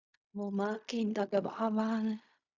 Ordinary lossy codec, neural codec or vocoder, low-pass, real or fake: Opus, 64 kbps; codec, 16 kHz in and 24 kHz out, 0.4 kbps, LongCat-Audio-Codec, fine tuned four codebook decoder; 7.2 kHz; fake